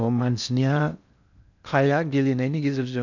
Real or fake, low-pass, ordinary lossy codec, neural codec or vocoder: fake; 7.2 kHz; none; codec, 16 kHz in and 24 kHz out, 0.6 kbps, FocalCodec, streaming, 4096 codes